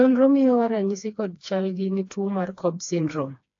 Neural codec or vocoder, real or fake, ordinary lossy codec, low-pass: codec, 16 kHz, 2 kbps, FreqCodec, smaller model; fake; AAC, 48 kbps; 7.2 kHz